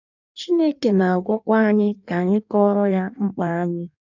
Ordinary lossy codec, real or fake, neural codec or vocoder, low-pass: none; fake; codec, 16 kHz in and 24 kHz out, 1.1 kbps, FireRedTTS-2 codec; 7.2 kHz